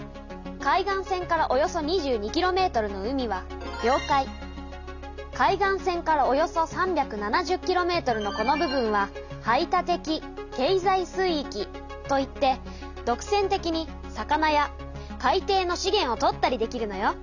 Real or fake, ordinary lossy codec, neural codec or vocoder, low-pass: real; none; none; 7.2 kHz